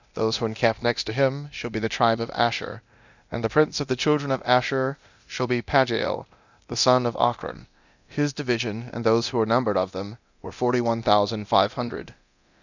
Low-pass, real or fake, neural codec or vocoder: 7.2 kHz; fake; codec, 24 kHz, 0.9 kbps, DualCodec